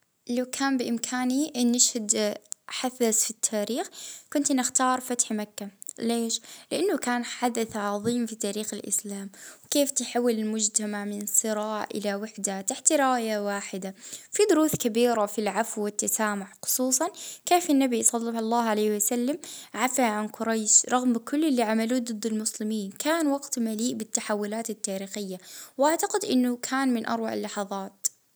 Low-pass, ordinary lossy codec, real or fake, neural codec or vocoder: none; none; real; none